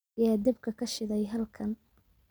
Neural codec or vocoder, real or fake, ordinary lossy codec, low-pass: none; real; none; none